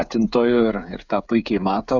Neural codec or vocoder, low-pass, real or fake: codec, 16 kHz, 16 kbps, FreqCodec, smaller model; 7.2 kHz; fake